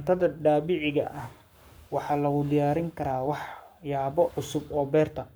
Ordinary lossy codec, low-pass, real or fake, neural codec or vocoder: none; none; fake; codec, 44.1 kHz, 7.8 kbps, Pupu-Codec